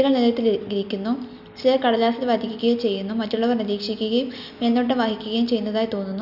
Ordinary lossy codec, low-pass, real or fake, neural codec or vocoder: none; 5.4 kHz; real; none